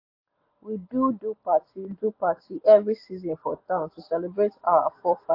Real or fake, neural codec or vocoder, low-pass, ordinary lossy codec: real; none; 5.4 kHz; AAC, 32 kbps